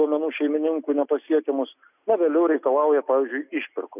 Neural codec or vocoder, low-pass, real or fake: none; 3.6 kHz; real